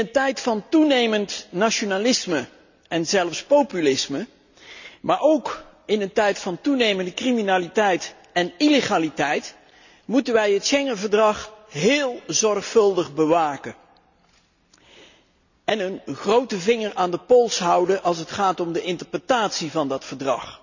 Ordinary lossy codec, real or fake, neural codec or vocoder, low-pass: none; real; none; 7.2 kHz